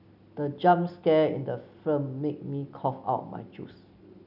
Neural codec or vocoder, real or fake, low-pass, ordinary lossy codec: none; real; 5.4 kHz; none